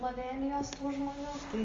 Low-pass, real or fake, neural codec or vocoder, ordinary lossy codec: 7.2 kHz; real; none; Opus, 32 kbps